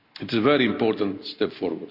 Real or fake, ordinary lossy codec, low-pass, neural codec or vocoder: real; none; 5.4 kHz; none